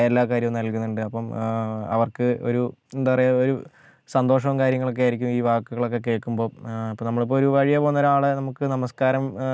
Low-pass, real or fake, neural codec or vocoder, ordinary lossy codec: none; real; none; none